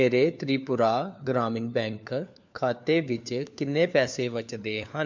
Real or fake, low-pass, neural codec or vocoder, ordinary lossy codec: fake; 7.2 kHz; codec, 16 kHz, 4 kbps, FunCodec, trained on LibriTTS, 50 frames a second; MP3, 64 kbps